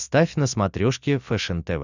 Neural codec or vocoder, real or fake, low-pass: none; real; 7.2 kHz